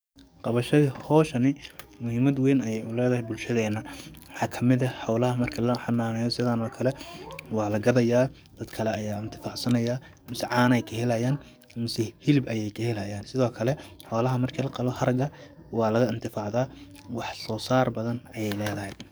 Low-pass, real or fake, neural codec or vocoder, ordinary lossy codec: none; fake; codec, 44.1 kHz, 7.8 kbps, DAC; none